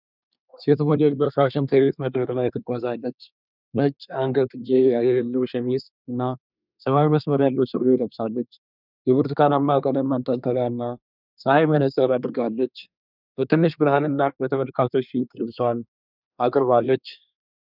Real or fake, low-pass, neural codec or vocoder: fake; 5.4 kHz; codec, 24 kHz, 1 kbps, SNAC